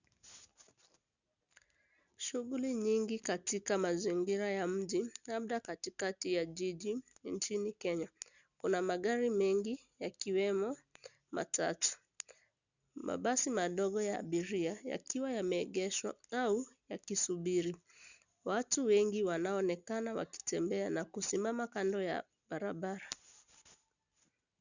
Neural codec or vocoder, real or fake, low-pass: none; real; 7.2 kHz